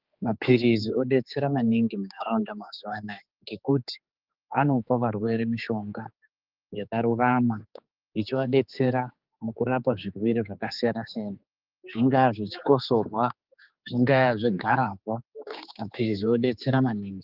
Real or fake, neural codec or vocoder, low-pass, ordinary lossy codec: fake; codec, 16 kHz, 4 kbps, X-Codec, HuBERT features, trained on general audio; 5.4 kHz; Opus, 24 kbps